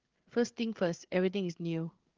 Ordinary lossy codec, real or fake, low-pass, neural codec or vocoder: Opus, 16 kbps; fake; 7.2 kHz; codec, 16 kHz, 8 kbps, FreqCodec, larger model